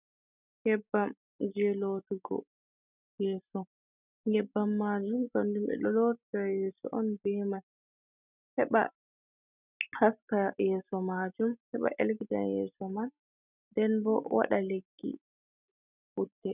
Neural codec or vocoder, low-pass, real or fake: none; 3.6 kHz; real